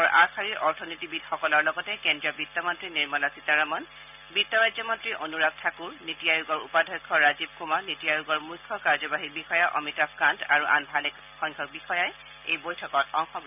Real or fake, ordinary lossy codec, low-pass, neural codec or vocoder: real; none; 3.6 kHz; none